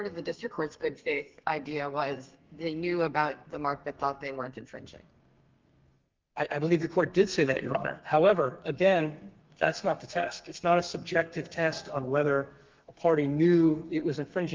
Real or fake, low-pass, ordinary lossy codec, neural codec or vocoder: fake; 7.2 kHz; Opus, 32 kbps; codec, 32 kHz, 1.9 kbps, SNAC